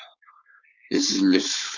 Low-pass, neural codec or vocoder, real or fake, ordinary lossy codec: 7.2 kHz; codec, 16 kHz, 4.8 kbps, FACodec; fake; Opus, 64 kbps